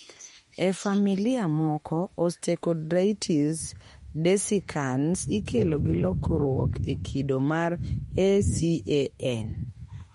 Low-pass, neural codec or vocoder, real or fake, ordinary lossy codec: 19.8 kHz; autoencoder, 48 kHz, 32 numbers a frame, DAC-VAE, trained on Japanese speech; fake; MP3, 48 kbps